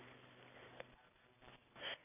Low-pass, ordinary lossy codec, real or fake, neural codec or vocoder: 3.6 kHz; none; real; none